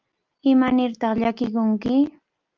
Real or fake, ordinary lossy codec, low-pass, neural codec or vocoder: real; Opus, 32 kbps; 7.2 kHz; none